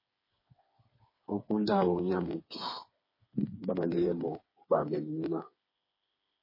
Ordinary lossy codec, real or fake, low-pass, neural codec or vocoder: MP3, 24 kbps; fake; 5.4 kHz; codec, 16 kHz, 4 kbps, FreqCodec, smaller model